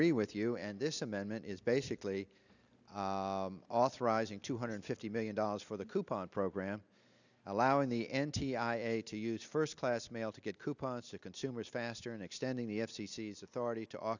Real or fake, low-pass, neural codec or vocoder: real; 7.2 kHz; none